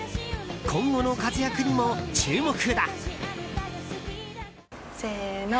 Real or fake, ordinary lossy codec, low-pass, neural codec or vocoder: real; none; none; none